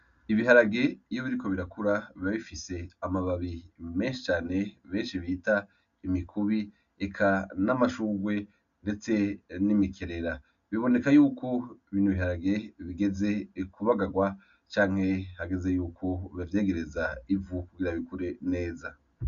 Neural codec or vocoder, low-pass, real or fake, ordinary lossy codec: none; 7.2 kHz; real; MP3, 96 kbps